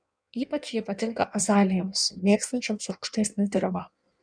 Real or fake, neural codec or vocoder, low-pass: fake; codec, 16 kHz in and 24 kHz out, 1.1 kbps, FireRedTTS-2 codec; 9.9 kHz